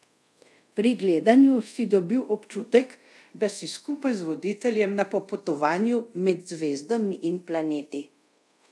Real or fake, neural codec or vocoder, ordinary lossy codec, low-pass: fake; codec, 24 kHz, 0.5 kbps, DualCodec; none; none